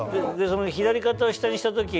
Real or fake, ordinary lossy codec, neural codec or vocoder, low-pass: real; none; none; none